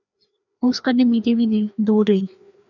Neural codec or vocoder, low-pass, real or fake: codec, 16 kHz, 2 kbps, FreqCodec, larger model; 7.2 kHz; fake